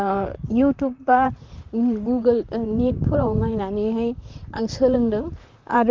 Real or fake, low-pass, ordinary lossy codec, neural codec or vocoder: fake; 7.2 kHz; Opus, 24 kbps; vocoder, 44.1 kHz, 128 mel bands, Pupu-Vocoder